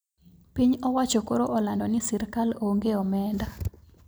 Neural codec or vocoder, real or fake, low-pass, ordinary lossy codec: none; real; none; none